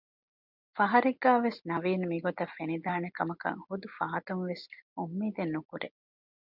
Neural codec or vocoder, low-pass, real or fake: none; 5.4 kHz; real